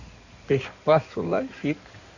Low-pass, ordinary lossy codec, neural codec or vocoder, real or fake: 7.2 kHz; none; codec, 16 kHz in and 24 kHz out, 2.2 kbps, FireRedTTS-2 codec; fake